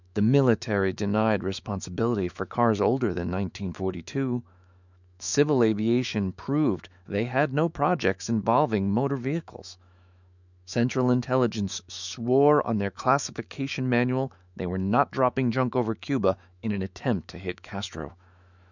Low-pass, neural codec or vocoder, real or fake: 7.2 kHz; autoencoder, 48 kHz, 128 numbers a frame, DAC-VAE, trained on Japanese speech; fake